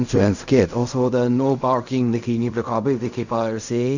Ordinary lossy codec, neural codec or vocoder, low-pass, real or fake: none; codec, 16 kHz in and 24 kHz out, 0.4 kbps, LongCat-Audio-Codec, fine tuned four codebook decoder; 7.2 kHz; fake